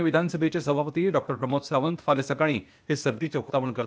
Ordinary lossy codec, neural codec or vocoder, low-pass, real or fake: none; codec, 16 kHz, 0.8 kbps, ZipCodec; none; fake